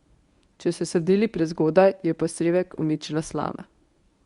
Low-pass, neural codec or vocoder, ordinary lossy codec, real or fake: 10.8 kHz; codec, 24 kHz, 0.9 kbps, WavTokenizer, medium speech release version 1; Opus, 64 kbps; fake